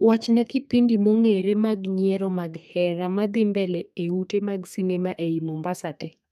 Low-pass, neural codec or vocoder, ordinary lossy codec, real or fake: 14.4 kHz; codec, 32 kHz, 1.9 kbps, SNAC; none; fake